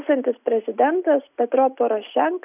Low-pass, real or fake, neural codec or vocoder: 3.6 kHz; real; none